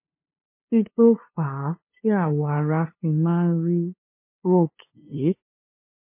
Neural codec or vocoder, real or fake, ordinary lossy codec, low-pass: codec, 16 kHz, 2 kbps, FunCodec, trained on LibriTTS, 25 frames a second; fake; MP3, 24 kbps; 3.6 kHz